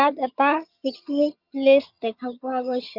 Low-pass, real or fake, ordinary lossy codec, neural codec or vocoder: 5.4 kHz; fake; Opus, 64 kbps; vocoder, 22.05 kHz, 80 mel bands, HiFi-GAN